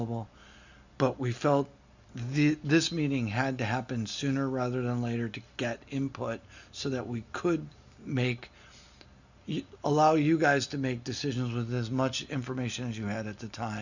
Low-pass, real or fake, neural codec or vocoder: 7.2 kHz; real; none